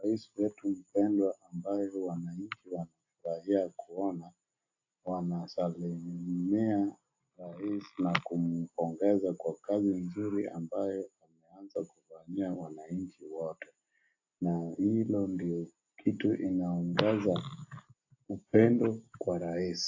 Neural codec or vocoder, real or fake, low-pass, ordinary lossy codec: none; real; 7.2 kHz; AAC, 48 kbps